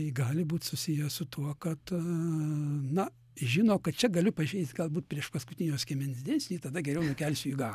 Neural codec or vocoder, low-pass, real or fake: none; 14.4 kHz; real